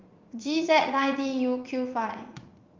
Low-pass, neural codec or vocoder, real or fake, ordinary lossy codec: 7.2 kHz; vocoder, 44.1 kHz, 80 mel bands, Vocos; fake; Opus, 24 kbps